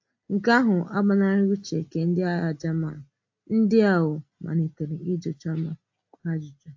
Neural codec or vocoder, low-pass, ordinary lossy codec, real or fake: none; 7.2 kHz; AAC, 48 kbps; real